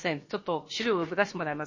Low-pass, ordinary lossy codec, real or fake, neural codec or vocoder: 7.2 kHz; MP3, 32 kbps; fake; codec, 16 kHz, about 1 kbps, DyCAST, with the encoder's durations